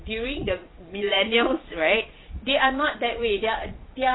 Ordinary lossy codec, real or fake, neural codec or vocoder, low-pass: AAC, 16 kbps; fake; vocoder, 22.05 kHz, 80 mel bands, Vocos; 7.2 kHz